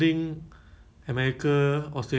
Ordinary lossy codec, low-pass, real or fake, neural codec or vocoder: none; none; real; none